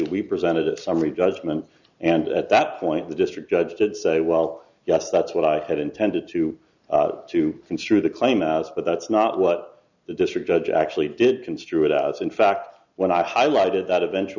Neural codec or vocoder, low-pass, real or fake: none; 7.2 kHz; real